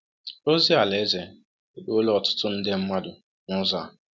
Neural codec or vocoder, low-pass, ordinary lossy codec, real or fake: none; none; none; real